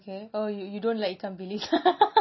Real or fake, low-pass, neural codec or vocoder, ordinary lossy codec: real; 7.2 kHz; none; MP3, 24 kbps